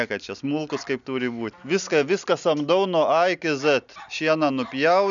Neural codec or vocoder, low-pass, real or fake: none; 7.2 kHz; real